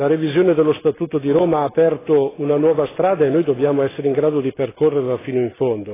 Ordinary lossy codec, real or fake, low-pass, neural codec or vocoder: AAC, 16 kbps; real; 3.6 kHz; none